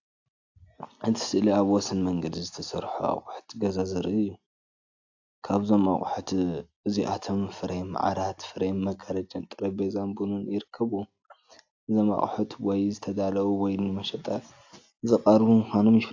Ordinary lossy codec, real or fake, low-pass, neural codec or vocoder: AAC, 48 kbps; real; 7.2 kHz; none